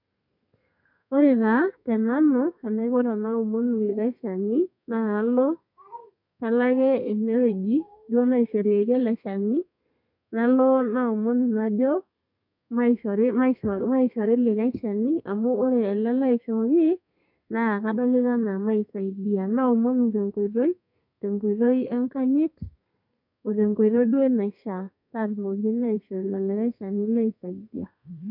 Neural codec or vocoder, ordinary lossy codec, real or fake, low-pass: codec, 32 kHz, 1.9 kbps, SNAC; none; fake; 5.4 kHz